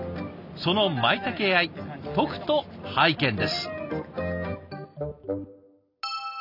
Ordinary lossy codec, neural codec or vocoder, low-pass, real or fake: none; none; 5.4 kHz; real